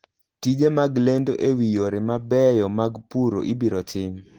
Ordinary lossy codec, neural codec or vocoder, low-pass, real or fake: Opus, 16 kbps; none; 19.8 kHz; real